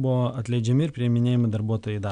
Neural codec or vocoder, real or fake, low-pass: none; real; 9.9 kHz